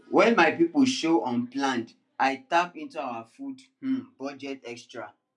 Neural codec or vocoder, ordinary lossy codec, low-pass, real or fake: none; none; 10.8 kHz; real